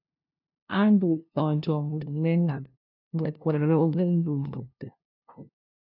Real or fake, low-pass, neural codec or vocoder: fake; 5.4 kHz; codec, 16 kHz, 0.5 kbps, FunCodec, trained on LibriTTS, 25 frames a second